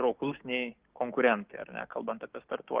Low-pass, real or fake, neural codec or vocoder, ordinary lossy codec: 3.6 kHz; fake; codec, 16 kHz, 6 kbps, DAC; Opus, 16 kbps